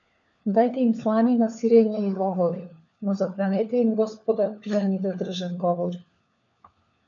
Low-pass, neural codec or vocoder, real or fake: 7.2 kHz; codec, 16 kHz, 4 kbps, FunCodec, trained on LibriTTS, 50 frames a second; fake